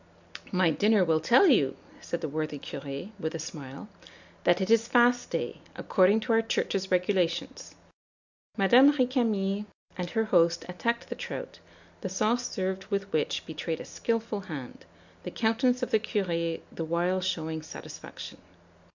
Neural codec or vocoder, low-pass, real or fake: none; 7.2 kHz; real